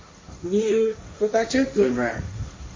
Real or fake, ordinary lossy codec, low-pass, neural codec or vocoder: fake; MP3, 32 kbps; 7.2 kHz; codec, 16 kHz, 1.1 kbps, Voila-Tokenizer